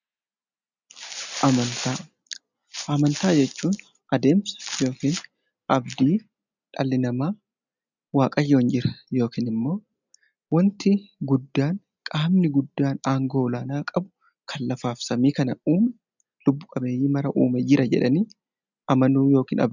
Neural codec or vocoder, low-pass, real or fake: none; 7.2 kHz; real